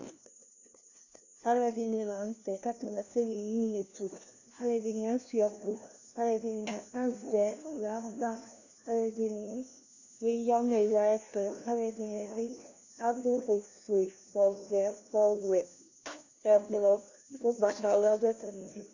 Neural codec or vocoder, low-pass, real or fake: codec, 16 kHz, 1 kbps, FunCodec, trained on LibriTTS, 50 frames a second; 7.2 kHz; fake